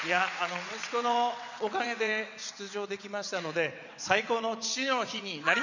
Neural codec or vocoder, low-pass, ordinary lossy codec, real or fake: vocoder, 22.05 kHz, 80 mel bands, WaveNeXt; 7.2 kHz; none; fake